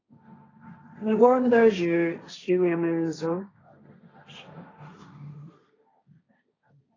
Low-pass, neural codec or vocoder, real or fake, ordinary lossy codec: 7.2 kHz; codec, 16 kHz, 1.1 kbps, Voila-Tokenizer; fake; AAC, 32 kbps